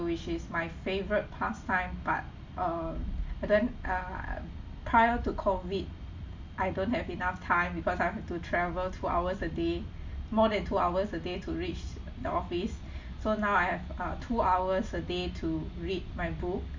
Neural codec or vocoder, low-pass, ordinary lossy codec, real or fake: none; 7.2 kHz; MP3, 48 kbps; real